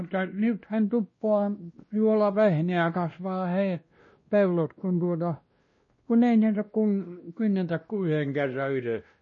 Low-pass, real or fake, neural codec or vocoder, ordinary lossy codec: 7.2 kHz; fake; codec, 16 kHz, 1 kbps, X-Codec, WavLM features, trained on Multilingual LibriSpeech; MP3, 32 kbps